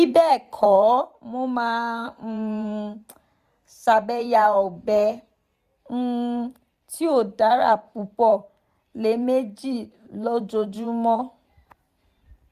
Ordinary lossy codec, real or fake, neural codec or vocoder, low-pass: Opus, 64 kbps; fake; vocoder, 44.1 kHz, 128 mel bands every 512 samples, BigVGAN v2; 14.4 kHz